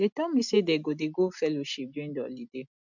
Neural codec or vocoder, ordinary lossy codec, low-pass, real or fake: none; none; 7.2 kHz; real